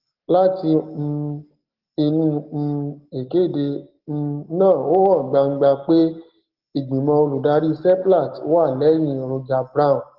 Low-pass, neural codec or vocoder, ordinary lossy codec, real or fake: 5.4 kHz; none; Opus, 16 kbps; real